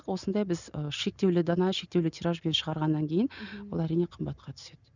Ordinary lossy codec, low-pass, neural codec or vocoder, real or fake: none; 7.2 kHz; none; real